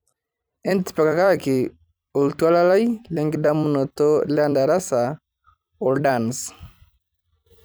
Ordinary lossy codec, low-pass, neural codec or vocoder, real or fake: none; none; vocoder, 44.1 kHz, 128 mel bands every 256 samples, BigVGAN v2; fake